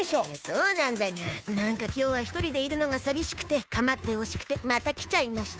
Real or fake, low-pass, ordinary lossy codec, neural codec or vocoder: fake; none; none; codec, 16 kHz, 2 kbps, FunCodec, trained on Chinese and English, 25 frames a second